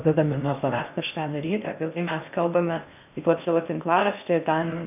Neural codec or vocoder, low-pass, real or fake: codec, 16 kHz in and 24 kHz out, 0.6 kbps, FocalCodec, streaming, 2048 codes; 3.6 kHz; fake